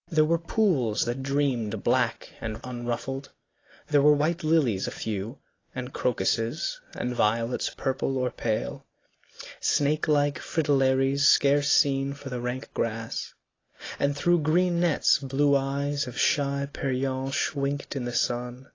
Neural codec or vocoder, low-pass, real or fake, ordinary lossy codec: none; 7.2 kHz; real; AAC, 32 kbps